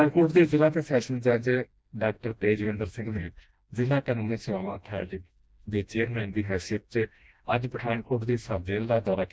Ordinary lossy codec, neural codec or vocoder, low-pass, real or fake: none; codec, 16 kHz, 1 kbps, FreqCodec, smaller model; none; fake